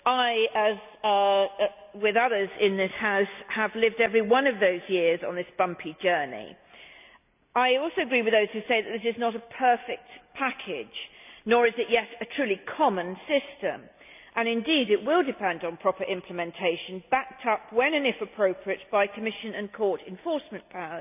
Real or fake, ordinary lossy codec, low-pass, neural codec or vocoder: real; none; 3.6 kHz; none